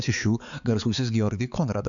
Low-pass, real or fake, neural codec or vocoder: 7.2 kHz; fake; codec, 16 kHz, 4 kbps, X-Codec, HuBERT features, trained on balanced general audio